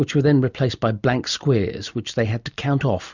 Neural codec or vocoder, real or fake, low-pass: none; real; 7.2 kHz